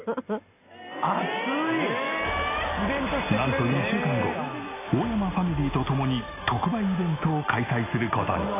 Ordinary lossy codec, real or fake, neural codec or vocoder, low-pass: AAC, 16 kbps; real; none; 3.6 kHz